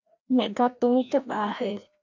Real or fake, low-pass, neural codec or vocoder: fake; 7.2 kHz; codec, 16 kHz, 1 kbps, FreqCodec, larger model